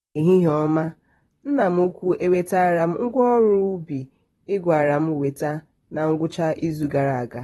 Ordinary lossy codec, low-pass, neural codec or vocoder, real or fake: AAC, 32 kbps; 19.8 kHz; vocoder, 44.1 kHz, 128 mel bands, Pupu-Vocoder; fake